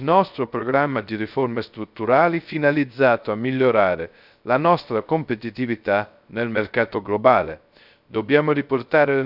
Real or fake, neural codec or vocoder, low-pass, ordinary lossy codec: fake; codec, 16 kHz, 0.3 kbps, FocalCodec; 5.4 kHz; none